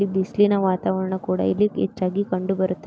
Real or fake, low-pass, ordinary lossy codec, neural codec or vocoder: real; none; none; none